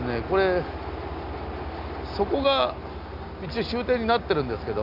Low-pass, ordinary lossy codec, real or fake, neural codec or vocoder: 5.4 kHz; none; real; none